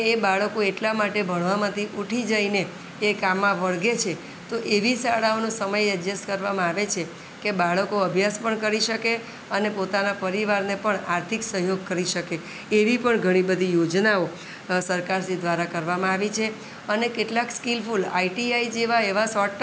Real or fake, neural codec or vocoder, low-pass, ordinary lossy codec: real; none; none; none